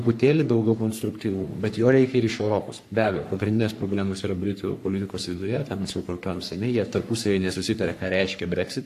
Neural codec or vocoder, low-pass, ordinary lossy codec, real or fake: codec, 44.1 kHz, 3.4 kbps, Pupu-Codec; 14.4 kHz; AAC, 64 kbps; fake